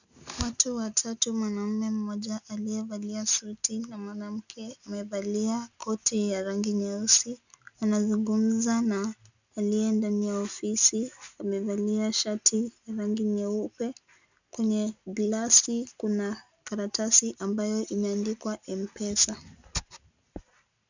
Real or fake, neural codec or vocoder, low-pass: real; none; 7.2 kHz